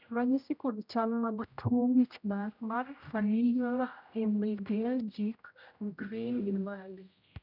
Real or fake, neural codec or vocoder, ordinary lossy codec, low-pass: fake; codec, 16 kHz, 0.5 kbps, X-Codec, HuBERT features, trained on general audio; none; 5.4 kHz